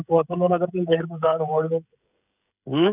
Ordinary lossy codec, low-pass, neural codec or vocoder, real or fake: none; 3.6 kHz; codec, 16 kHz, 16 kbps, FreqCodec, smaller model; fake